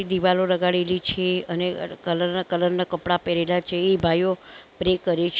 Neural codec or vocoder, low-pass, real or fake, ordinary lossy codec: none; none; real; none